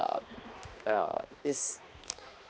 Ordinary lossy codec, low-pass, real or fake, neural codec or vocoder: none; none; fake; codec, 16 kHz, 2 kbps, X-Codec, HuBERT features, trained on balanced general audio